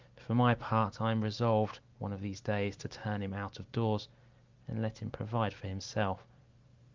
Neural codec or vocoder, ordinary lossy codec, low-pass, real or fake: none; Opus, 24 kbps; 7.2 kHz; real